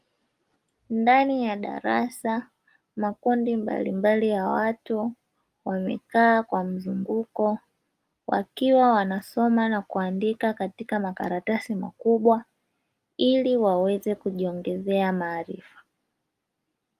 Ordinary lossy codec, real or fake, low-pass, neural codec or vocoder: Opus, 32 kbps; real; 14.4 kHz; none